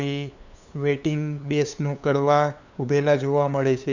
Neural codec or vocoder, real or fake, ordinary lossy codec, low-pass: codec, 16 kHz, 2 kbps, FunCodec, trained on LibriTTS, 25 frames a second; fake; none; 7.2 kHz